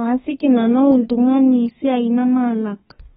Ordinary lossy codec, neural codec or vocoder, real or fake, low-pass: AAC, 16 kbps; codec, 32 kHz, 1.9 kbps, SNAC; fake; 14.4 kHz